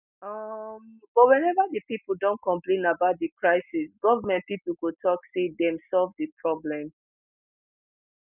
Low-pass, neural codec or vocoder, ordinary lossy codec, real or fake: 3.6 kHz; none; none; real